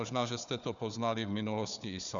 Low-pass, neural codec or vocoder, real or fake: 7.2 kHz; codec, 16 kHz, 4 kbps, FunCodec, trained on Chinese and English, 50 frames a second; fake